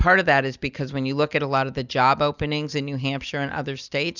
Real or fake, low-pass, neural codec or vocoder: real; 7.2 kHz; none